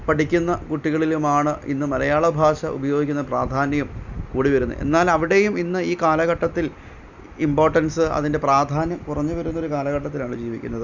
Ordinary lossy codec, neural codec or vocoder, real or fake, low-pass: none; none; real; 7.2 kHz